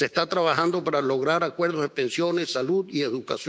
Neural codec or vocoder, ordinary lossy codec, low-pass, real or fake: codec, 16 kHz, 6 kbps, DAC; none; none; fake